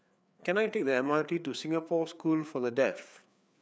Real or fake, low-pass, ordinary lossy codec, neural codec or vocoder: fake; none; none; codec, 16 kHz, 4 kbps, FreqCodec, larger model